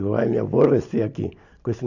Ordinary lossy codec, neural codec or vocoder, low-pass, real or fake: none; none; 7.2 kHz; real